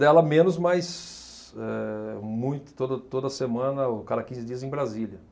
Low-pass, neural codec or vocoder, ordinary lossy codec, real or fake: none; none; none; real